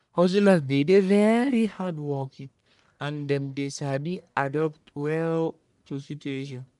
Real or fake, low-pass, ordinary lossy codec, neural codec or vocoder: fake; 10.8 kHz; MP3, 96 kbps; codec, 44.1 kHz, 1.7 kbps, Pupu-Codec